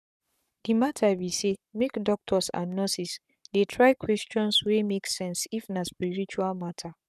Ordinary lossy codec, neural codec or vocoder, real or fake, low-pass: none; codec, 44.1 kHz, 7.8 kbps, Pupu-Codec; fake; 14.4 kHz